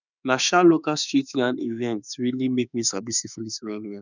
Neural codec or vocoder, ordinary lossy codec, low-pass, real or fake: autoencoder, 48 kHz, 32 numbers a frame, DAC-VAE, trained on Japanese speech; none; 7.2 kHz; fake